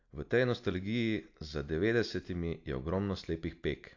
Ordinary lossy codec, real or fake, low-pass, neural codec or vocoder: AAC, 48 kbps; real; 7.2 kHz; none